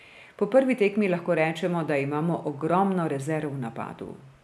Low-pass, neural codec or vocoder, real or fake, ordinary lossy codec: none; none; real; none